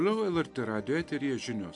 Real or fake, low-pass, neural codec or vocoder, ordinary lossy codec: real; 10.8 kHz; none; AAC, 64 kbps